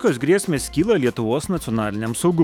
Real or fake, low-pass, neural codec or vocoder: fake; 19.8 kHz; autoencoder, 48 kHz, 128 numbers a frame, DAC-VAE, trained on Japanese speech